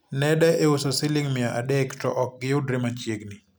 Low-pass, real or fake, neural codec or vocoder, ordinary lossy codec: none; real; none; none